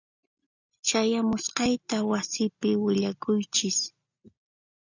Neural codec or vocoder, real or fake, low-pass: none; real; 7.2 kHz